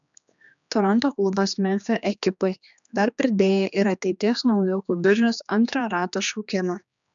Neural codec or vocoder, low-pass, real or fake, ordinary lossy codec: codec, 16 kHz, 2 kbps, X-Codec, HuBERT features, trained on general audio; 7.2 kHz; fake; MP3, 96 kbps